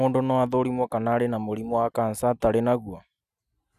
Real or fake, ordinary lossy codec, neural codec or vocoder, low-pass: real; none; none; 14.4 kHz